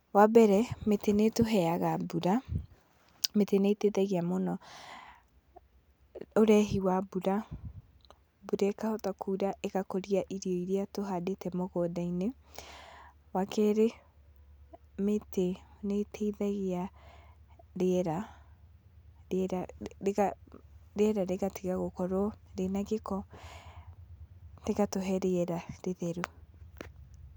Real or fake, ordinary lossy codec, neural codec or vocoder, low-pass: real; none; none; none